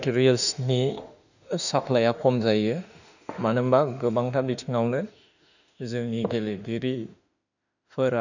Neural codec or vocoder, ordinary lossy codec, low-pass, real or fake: autoencoder, 48 kHz, 32 numbers a frame, DAC-VAE, trained on Japanese speech; none; 7.2 kHz; fake